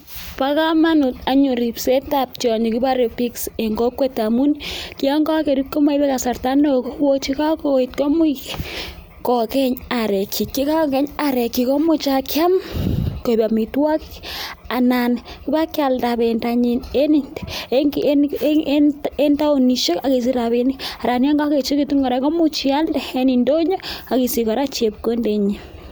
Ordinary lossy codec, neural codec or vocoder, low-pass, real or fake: none; none; none; real